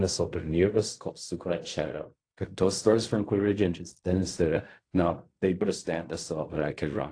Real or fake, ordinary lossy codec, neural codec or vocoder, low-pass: fake; AAC, 48 kbps; codec, 16 kHz in and 24 kHz out, 0.4 kbps, LongCat-Audio-Codec, fine tuned four codebook decoder; 9.9 kHz